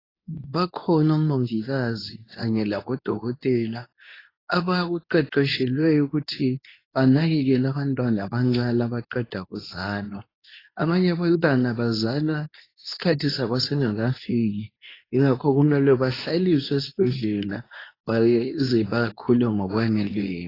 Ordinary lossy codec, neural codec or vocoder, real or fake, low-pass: AAC, 24 kbps; codec, 24 kHz, 0.9 kbps, WavTokenizer, medium speech release version 2; fake; 5.4 kHz